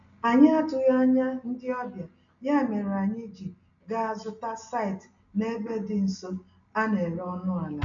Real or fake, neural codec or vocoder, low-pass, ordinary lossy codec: real; none; 7.2 kHz; none